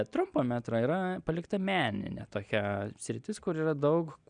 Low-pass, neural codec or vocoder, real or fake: 9.9 kHz; none; real